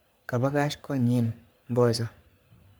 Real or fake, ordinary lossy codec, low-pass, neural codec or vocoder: fake; none; none; codec, 44.1 kHz, 3.4 kbps, Pupu-Codec